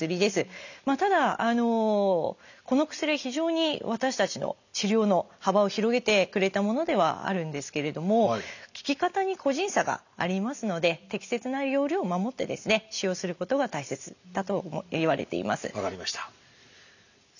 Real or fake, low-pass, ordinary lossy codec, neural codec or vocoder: real; 7.2 kHz; none; none